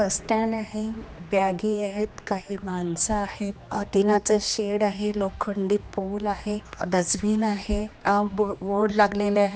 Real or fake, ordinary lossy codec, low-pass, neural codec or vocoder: fake; none; none; codec, 16 kHz, 2 kbps, X-Codec, HuBERT features, trained on general audio